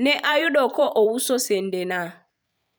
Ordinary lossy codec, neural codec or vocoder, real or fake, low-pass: none; vocoder, 44.1 kHz, 128 mel bands every 512 samples, BigVGAN v2; fake; none